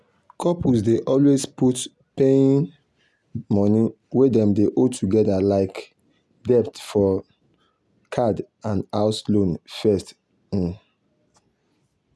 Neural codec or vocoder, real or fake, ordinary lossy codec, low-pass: none; real; none; none